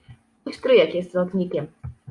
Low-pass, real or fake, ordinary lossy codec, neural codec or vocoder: 10.8 kHz; real; Opus, 64 kbps; none